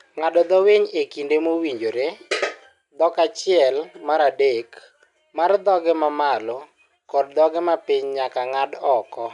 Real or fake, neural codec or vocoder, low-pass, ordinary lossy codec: real; none; 10.8 kHz; none